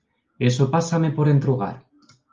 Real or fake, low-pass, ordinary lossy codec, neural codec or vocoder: real; 7.2 kHz; Opus, 32 kbps; none